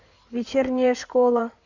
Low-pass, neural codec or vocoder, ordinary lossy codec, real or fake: 7.2 kHz; codec, 16 kHz in and 24 kHz out, 2.2 kbps, FireRedTTS-2 codec; none; fake